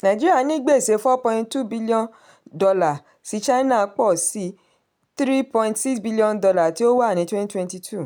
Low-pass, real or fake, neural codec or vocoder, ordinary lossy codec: none; real; none; none